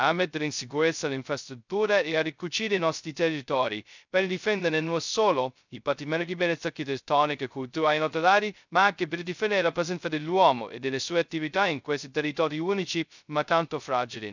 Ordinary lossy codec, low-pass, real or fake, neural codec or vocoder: none; 7.2 kHz; fake; codec, 16 kHz, 0.2 kbps, FocalCodec